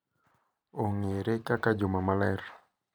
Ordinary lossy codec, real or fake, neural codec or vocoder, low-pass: none; fake; vocoder, 44.1 kHz, 128 mel bands every 512 samples, BigVGAN v2; none